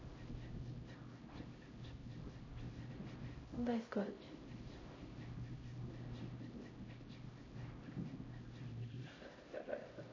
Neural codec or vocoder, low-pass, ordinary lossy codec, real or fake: codec, 16 kHz, 0.5 kbps, X-Codec, HuBERT features, trained on LibriSpeech; 7.2 kHz; MP3, 32 kbps; fake